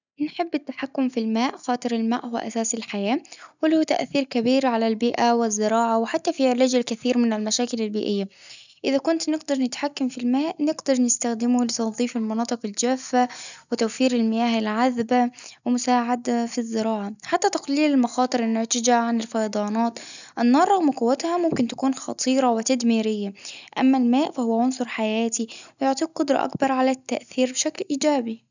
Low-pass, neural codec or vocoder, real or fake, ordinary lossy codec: 7.2 kHz; none; real; none